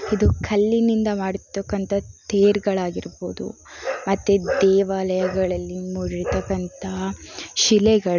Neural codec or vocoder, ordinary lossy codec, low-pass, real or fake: none; none; 7.2 kHz; real